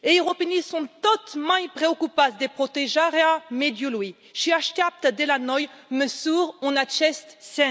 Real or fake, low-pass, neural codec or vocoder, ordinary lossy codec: real; none; none; none